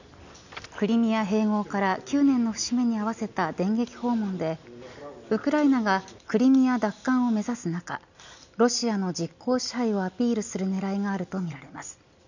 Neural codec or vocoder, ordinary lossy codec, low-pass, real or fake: none; none; 7.2 kHz; real